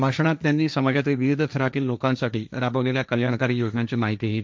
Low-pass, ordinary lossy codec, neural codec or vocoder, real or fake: none; none; codec, 16 kHz, 1.1 kbps, Voila-Tokenizer; fake